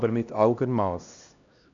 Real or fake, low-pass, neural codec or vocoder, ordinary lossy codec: fake; 7.2 kHz; codec, 16 kHz, 1 kbps, X-Codec, HuBERT features, trained on LibriSpeech; none